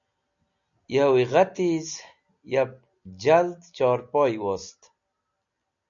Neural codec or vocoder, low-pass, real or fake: none; 7.2 kHz; real